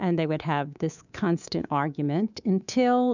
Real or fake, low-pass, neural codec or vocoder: fake; 7.2 kHz; codec, 24 kHz, 3.1 kbps, DualCodec